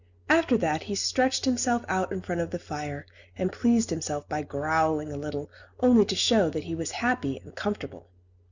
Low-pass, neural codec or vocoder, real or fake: 7.2 kHz; none; real